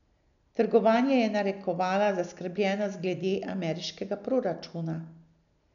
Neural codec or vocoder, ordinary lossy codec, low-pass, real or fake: none; none; 7.2 kHz; real